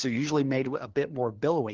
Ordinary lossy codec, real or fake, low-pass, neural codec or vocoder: Opus, 16 kbps; fake; 7.2 kHz; codec, 16 kHz, 4 kbps, FunCodec, trained on LibriTTS, 50 frames a second